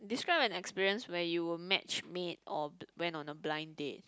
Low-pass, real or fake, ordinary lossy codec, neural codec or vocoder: none; real; none; none